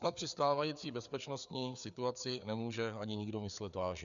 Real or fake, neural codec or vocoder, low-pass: fake; codec, 16 kHz, 4 kbps, FreqCodec, larger model; 7.2 kHz